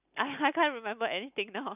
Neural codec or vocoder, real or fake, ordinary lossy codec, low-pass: none; real; none; 3.6 kHz